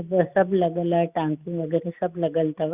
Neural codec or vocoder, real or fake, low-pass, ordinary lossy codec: none; real; 3.6 kHz; Opus, 64 kbps